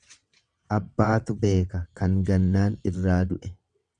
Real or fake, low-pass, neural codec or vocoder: fake; 9.9 kHz; vocoder, 22.05 kHz, 80 mel bands, WaveNeXt